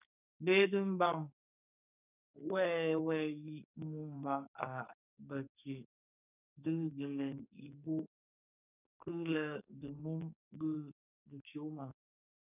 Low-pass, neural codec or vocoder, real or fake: 3.6 kHz; codec, 44.1 kHz, 2.6 kbps, SNAC; fake